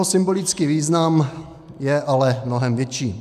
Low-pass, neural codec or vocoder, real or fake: 14.4 kHz; none; real